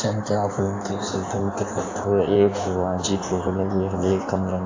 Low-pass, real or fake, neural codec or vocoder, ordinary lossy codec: 7.2 kHz; fake; codec, 16 kHz in and 24 kHz out, 1.1 kbps, FireRedTTS-2 codec; AAC, 48 kbps